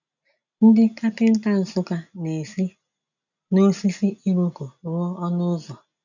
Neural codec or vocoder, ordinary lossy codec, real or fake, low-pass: none; none; real; 7.2 kHz